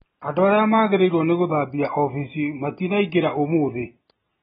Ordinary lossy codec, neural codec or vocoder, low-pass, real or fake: AAC, 16 kbps; none; 19.8 kHz; real